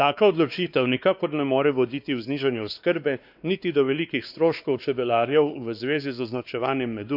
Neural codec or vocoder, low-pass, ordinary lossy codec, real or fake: codec, 16 kHz, 2 kbps, X-Codec, WavLM features, trained on Multilingual LibriSpeech; 5.4 kHz; none; fake